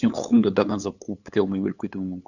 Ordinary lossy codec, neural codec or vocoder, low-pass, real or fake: none; codec, 16 kHz, 8 kbps, FunCodec, trained on LibriTTS, 25 frames a second; 7.2 kHz; fake